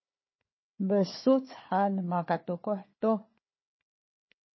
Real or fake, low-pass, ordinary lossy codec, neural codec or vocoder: fake; 7.2 kHz; MP3, 24 kbps; codec, 16 kHz, 4 kbps, FunCodec, trained on Chinese and English, 50 frames a second